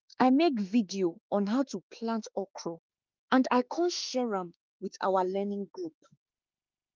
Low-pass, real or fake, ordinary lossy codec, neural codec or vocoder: 7.2 kHz; fake; Opus, 24 kbps; autoencoder, 48 kHz, 32 numbers a frame, DAC-VAE, trained on Japanese speech